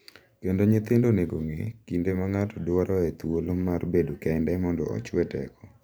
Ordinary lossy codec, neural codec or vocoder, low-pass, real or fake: none; vocoder, 44.1 kHz, 128 mel bands every 512 samples, BigVGAN v2; none; fake